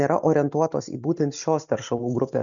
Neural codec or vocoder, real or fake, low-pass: none; real; 7.2 kHz